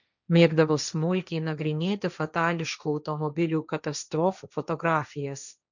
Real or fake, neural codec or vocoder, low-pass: fake; codec, 16 kHz, 1.1 kbps, Voila-Tokenizer; 7.2 kHz